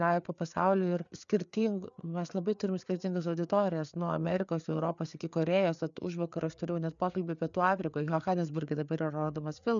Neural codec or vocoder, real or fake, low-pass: codec, 16 kHz, 4 kbps, FreqCodec, larger model; fake; 7.2 kHz